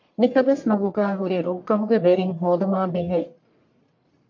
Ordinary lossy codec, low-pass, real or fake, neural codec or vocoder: MP3, 48 kbps; 7.2 kHz; fake; codec, 44.1 kHz, 1.7 kbps, Pupu-Codec